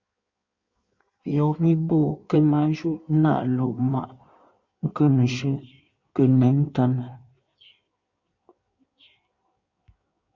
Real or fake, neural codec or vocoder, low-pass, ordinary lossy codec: fake; codec, 16 kHz in and 24 kHz out, 1.1 kbps, FireRedTTS-2 codec; 7.2 kHz; Opus, 64 kbps